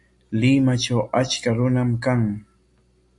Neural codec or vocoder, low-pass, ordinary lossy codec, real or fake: none; 10.8 kHz; MP3, 48 kbps; real